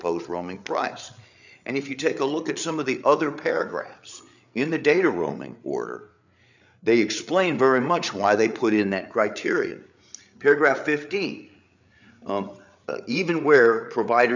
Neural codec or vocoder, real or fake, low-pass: codec, 16 kHz, 8 kbps, FreqCodec, larger model; fake; 7.2 kHz